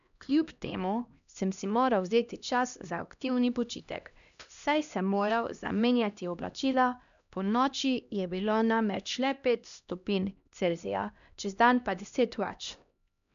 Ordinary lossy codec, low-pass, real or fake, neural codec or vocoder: none; 7.2 kHz; fake; codec, 16 kHz, 1 kbps, X-Codec, HuBERT features, trained on LibriSpeech